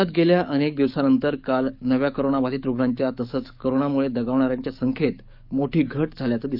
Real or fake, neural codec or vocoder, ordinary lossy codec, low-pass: fake; codec, 44.1 kHz, 7.8 kbps, DAC; none; 5.4 kHz